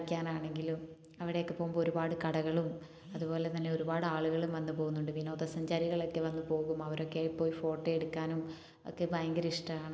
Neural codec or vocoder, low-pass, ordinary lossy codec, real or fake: none; none; none; real